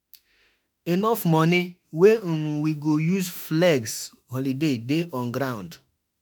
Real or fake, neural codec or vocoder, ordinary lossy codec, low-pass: fake; autoencoder, 48 kHz, 32 numbers a frame, DAC-VAE, trained on Japanese speech; none; none